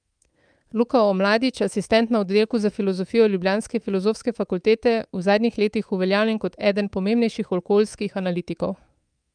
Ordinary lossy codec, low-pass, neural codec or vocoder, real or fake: Opus, 32 kbps; 9.9 kHz; codec, 24 kHz, 3.1 kbps, DualCodec; fake